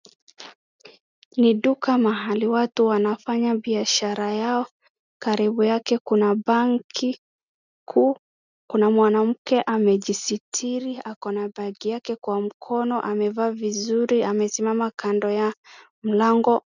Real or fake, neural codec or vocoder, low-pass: real; none; 7.2 kHz